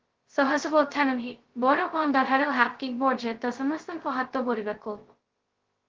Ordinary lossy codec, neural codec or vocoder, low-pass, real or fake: Opus, 16 kbps; codec, 16 kHz, 0.2 kbps, FocalCodec; 7.2 kHz; fake